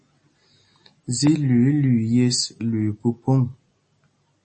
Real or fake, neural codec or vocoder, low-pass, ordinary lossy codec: real; none; 10.8 kHz; MP3, 32 kbps